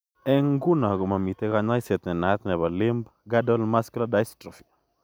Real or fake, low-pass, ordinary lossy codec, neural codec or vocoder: real; none; none; none